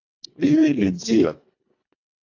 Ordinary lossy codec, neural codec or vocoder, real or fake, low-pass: AAC, 48 kbps; codec, 24 kHz, 1.5 kbps, HILCodec; fake; 7.2 kHz